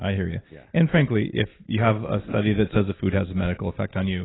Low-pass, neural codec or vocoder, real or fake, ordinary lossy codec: 7.2 kHz; none; real; AAC, 16 kbps